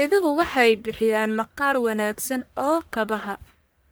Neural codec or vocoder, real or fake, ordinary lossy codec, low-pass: codec, 44.1 kHz, 1.7 kbps, Pupu-Codec; fake; none; none